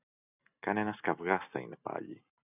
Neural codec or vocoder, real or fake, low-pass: none; real; 3.6 kHz